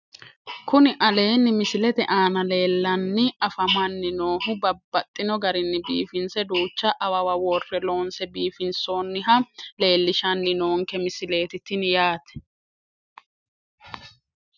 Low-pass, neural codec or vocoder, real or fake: 7.2 kHz; none; real